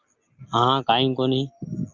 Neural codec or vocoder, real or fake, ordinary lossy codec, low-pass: none; real; Opus, 24 kbps; 7.2 kHz